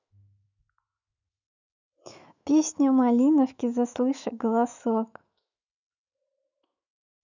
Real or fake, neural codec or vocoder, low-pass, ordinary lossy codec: fake; autoencoder, 48 kHz, 128 numbers a frame, DAC-VAE, trained on Japanese speech; 7.2 kHz; none